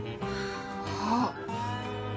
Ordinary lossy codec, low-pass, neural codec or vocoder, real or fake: none; none; none; real